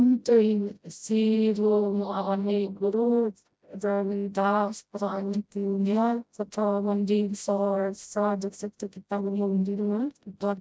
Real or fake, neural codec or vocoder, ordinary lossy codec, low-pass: fake; codec, 16 kHz, 0.5 kbps, FreqCodec, smaller model; none; none